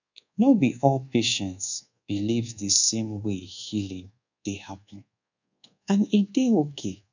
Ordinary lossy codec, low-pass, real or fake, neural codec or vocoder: none; 7.2 kHz; fake; codec, 24 kHz, 1.2 kbps, DualCodec